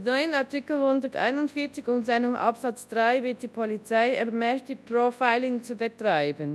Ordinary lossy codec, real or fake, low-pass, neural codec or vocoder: none; fake; none; codec, 24 kHz, 0.9 kbps, WavTokenizer, large speech release